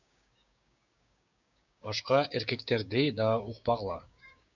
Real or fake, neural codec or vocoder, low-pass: fake; codec, 16 kHz, 6 kbps, DAC; 7.2 kHz